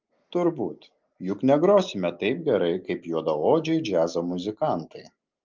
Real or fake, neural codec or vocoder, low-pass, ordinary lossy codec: real; none; 7.2 kHz; Opus, 24 kbps